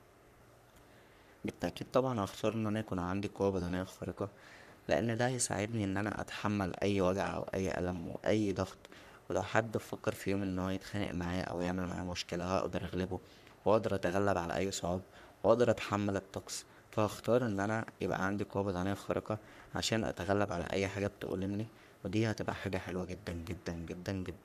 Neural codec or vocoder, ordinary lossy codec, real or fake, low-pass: codec, 44.1 kHz, 3.4 kbps, Pupu-Codec; none; fake; 14.4 kHz